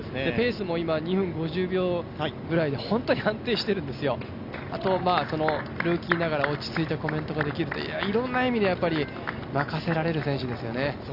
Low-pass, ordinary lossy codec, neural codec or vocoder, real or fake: 5.4 kHz; none; none; real